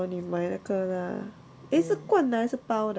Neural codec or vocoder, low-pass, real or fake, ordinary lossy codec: none; none; real; none